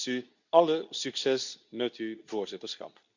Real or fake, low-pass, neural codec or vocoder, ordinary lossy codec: fake; 7.2 kHz; codec, 24 kHz, 0.9 kbps, WavTokenizer, medium speech release version 2; none